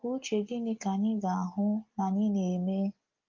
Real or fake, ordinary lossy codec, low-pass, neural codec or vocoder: real; Opus, 32 kbps; 7.2 kHz; none